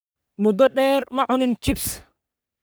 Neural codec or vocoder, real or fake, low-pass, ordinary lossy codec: codec, 44.1 kHz, 3.4 kbps, Pupu-Codec; fake; none; none